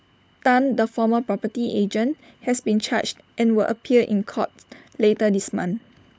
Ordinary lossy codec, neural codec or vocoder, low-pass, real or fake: none; codec, 16 kHz, 16 kbps, FunCodec, trained on LibriTTS, 50 frames a second; none; fake